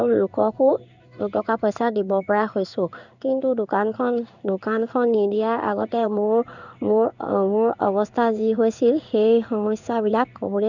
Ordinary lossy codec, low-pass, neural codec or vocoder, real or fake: none; 7.2 kHz; codec, 16 kHz in and 24 kHz out, 1 kbps, XY-Tokenizer; fake